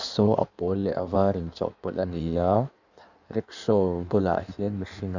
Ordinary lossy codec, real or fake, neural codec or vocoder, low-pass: none; fake; codec, 16 kHz in and 24 kHz out, 1.1 kbps, FireRedTTS-2 codec; 7.2 kHz